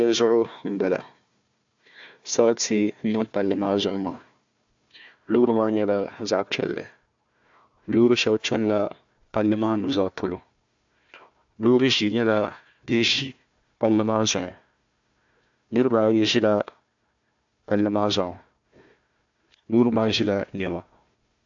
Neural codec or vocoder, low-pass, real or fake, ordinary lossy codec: codec, 16 kHz, 1 kbps, FunCodec, trained on Chinese and English, 50 frames a second; 7.2 kHz; fake; AAC, 64 kbps